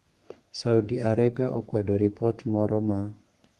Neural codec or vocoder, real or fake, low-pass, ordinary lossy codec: codec, 32 kHz, 1.9 kbps, SNAC; fake; 14.4 kHz; Opus, 24 kbps